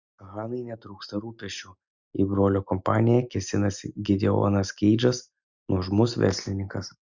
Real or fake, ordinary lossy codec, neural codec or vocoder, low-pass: real; Opus, 64 kbps; none; 7.2 kHz